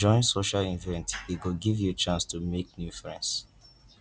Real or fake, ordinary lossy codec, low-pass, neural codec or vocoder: real; none; none; none